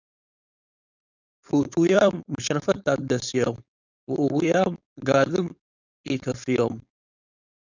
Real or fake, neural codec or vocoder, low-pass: fake; codec, 16 kHz, 4.8 kbps, FACodec; 7.2 kHz